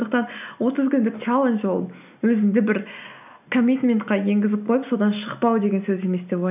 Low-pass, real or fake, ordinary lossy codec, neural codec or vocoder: 3.6 kHz; real; none; none